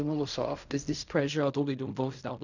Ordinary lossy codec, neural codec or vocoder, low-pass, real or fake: none; codec, 16 kHz in and 24 kHz out, 0.4 kbps, LongCat-Audio-Codec, fine tuned four codebook decoder; 7.2 kHz; fake